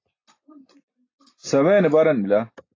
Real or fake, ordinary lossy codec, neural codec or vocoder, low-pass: real; AAC, 32 kbps; none; 7.2 kHz